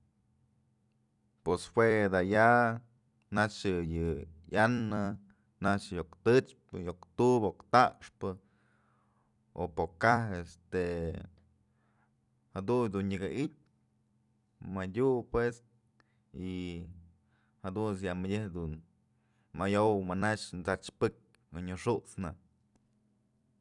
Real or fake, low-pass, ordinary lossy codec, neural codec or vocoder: fake; 10.8 kHz; none; vocoder, 44.1 kHz, 128 mel bands every 256 samples, BigVGAN v2